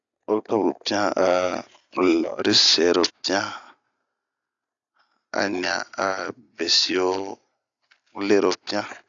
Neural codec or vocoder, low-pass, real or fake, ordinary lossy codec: none; 7.2 kHz; real; AAC, 64 kbps